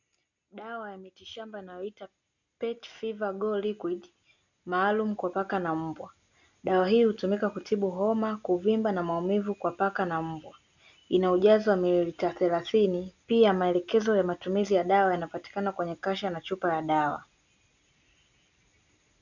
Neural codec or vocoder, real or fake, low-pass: none; real; 7.2 kHz